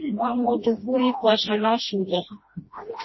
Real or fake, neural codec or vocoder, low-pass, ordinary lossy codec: fake; codec, 16 kHz, 1 kbps, FreqCodec, smaller model; 7.2 kHz; MP3, 24 kbps